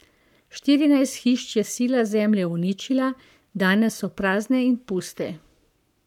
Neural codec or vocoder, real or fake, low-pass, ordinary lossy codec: codec, 44.1 kHz, 7.8 kbps, Pupu-Codec; fake; 19.8 kHz; none